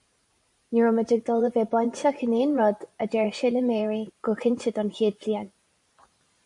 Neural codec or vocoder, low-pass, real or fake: vocoder, 44.1 kHz, 128 mel bands every 512 samples, BigVGAN v2; 10.8 kHz; fake